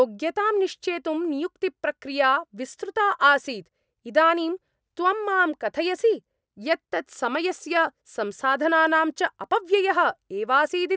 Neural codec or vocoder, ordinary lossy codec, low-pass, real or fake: none; none; none; real